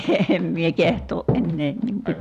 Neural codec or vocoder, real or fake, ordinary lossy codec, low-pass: vocoder, 44.1 kHz, 128 mel bands every 256 samples, BigVGAN v2; fake; none; 14.4 kHz